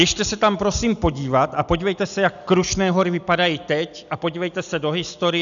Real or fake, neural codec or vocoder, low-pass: real; none; 7.2 kHz